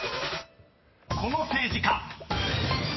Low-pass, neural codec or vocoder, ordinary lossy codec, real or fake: 7.2 kHz; none; MP3, 24 kbps; real